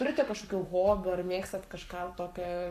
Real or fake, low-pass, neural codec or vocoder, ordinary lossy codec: fake; 14.4 kHz; codec, 44.1 kHz, 7.8 kbps, Pupu-Codec; AAC, 96 kbps